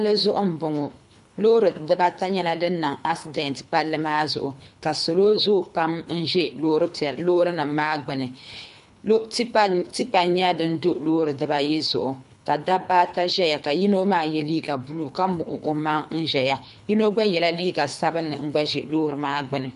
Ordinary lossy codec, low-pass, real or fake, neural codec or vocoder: MP3, 64 kbps; 10.8 kHz; fake; codec, 24 kHz, 3 kbps, HILCodec